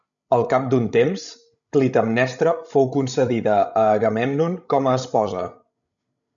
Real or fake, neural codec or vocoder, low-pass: fake; codec, 16 kHz, 16 kbps, FreqCodec, larger model; 7.2 kHz